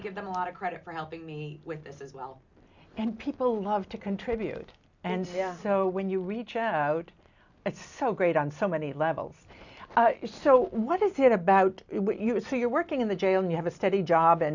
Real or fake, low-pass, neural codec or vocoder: real; 7.2 kHz; none